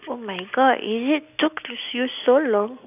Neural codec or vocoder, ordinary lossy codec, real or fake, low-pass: none; none; real; 3.6 kHz